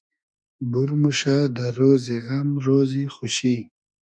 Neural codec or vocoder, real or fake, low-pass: autoencoder, 48 kHz, 32 numbers a frame, DAC-VAE, trained on Japanese speech; fake; 9.9 kHz